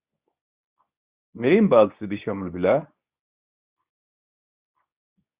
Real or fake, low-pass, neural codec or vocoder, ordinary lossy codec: fake; 3.6 kHz; codec, 24 kHz, 0.9 kbps, WavTokenizer, medium speech release version 2; Opus, 64 kbps